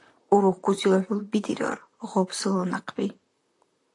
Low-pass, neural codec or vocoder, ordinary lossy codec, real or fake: 10.8 kHz; vocoder, 44.1 kHz, 128 mel bands, Pupu-Vocoder; AAC, 48 kbps; fake